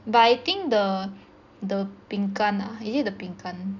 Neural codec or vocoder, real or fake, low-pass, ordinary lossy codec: none; real; 7.2 kHz; none